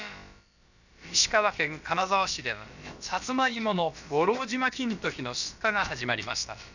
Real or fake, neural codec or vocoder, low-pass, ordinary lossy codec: fake; codec, 16 kHz, about 1 kbps, DyCAST, with the encoder's durations; 7.2 kHz; none